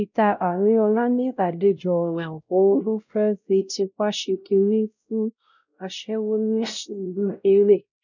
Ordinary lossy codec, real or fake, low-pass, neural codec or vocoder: none; fake; 7.2 kHz; codec, 16 kHz, 0.5 kbps, X-Codec, WavLM features, trained on Multilingual LibriSpeech